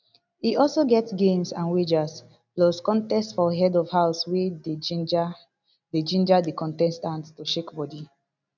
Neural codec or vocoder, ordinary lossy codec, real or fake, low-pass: none; none; real; 7.2 kHz